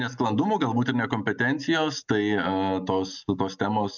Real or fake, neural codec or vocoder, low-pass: real; none; 7.2 kHz